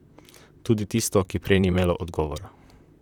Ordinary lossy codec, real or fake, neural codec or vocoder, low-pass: none; fake; vocoder, 44.1 kHz, 128 mel bands, Pupu-Vocoder; 19.8 kHz